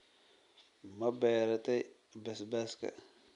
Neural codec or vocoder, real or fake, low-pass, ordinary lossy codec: none; real; 10.8 kHz; none